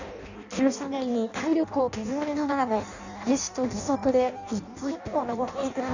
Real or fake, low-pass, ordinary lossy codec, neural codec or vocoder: fake; 7.2 kHz; none; codec, 16 kHz in and 24 kHz out, 0.6 kbps, FireRedTTS-2 codec